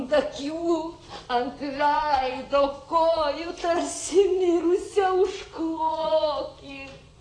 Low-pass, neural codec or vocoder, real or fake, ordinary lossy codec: 9.9 kHz; vocoder, 24 kHz, 100 mel bands, Vocos; fake; AAC, 32 kbps